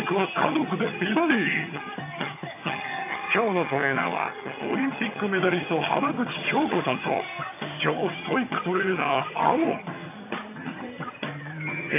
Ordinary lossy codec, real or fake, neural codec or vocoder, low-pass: AAC, 24 kbps; fake; vocoder, 22.05 kHz, 80 mel bands, HiFi-GAN; 3.6 kHz